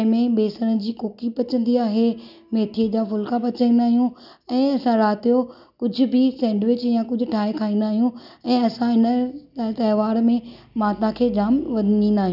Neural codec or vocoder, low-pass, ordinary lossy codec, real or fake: none; 5.4 kHz; none; real